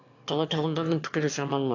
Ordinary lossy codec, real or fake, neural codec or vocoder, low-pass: AAC, 48 kbps; fake; autoencoder, 22.05 kHz, a latent of 192 numbers a frame, VITS, trained on one speaker; 7.2 kHz